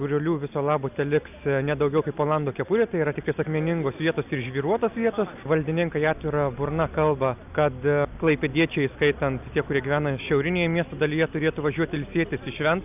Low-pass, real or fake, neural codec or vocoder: 3.6 kHz; real; none